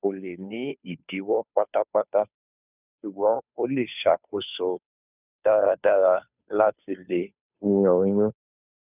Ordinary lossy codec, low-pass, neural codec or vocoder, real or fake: none; 3.6 kHz; codec, 16 kHz, 2 kbps, FunCodec, trained on Chinese and English, 25 frames a second; fake